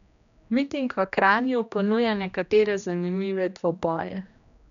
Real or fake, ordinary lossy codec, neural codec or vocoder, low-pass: fake; none; codec, 16 kHz, 1 kbps, X-Codec, HuBERT features, trained on general audio; 7.2 kHz